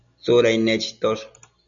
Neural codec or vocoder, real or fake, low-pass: none; real; 7.2 kHz